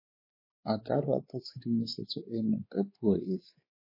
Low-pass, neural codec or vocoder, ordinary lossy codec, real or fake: 5.4 kHz; codec, 16 kHz, 4 kbps, X-Codec, WavLM features, trained on Multilingual LibriSpeech; MP3, 24 kbps; fake